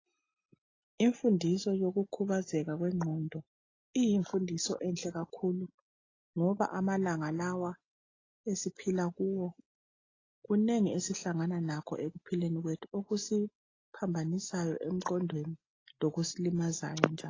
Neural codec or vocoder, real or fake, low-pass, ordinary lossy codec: none; real; 7.2 kHz; AAC, 32 kbps